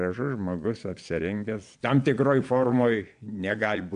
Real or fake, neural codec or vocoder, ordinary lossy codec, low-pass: fake; vocoder, 22.05 kHz, 80 mel bands, Vocos; AAC, 64 kbps; 9.9 kHz